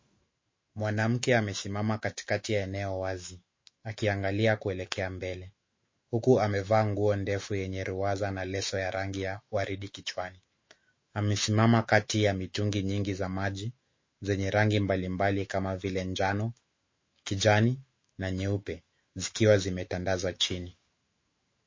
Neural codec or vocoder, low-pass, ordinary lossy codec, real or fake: autoencoder, 48 kHz, 128 numbers a frame, DAC-VAE, trained on Japanese speech; 7.2 kHz; MP3, 32 kbps; fake